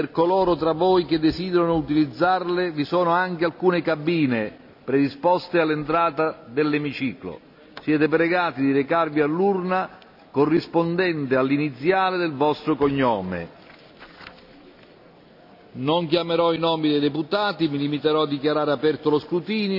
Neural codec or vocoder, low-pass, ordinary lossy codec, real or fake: none; 5.4 kHz; none; real